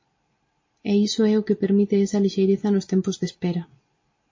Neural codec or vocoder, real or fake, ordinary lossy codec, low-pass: none; real; MP3, 32 kbps; 7.2 kHz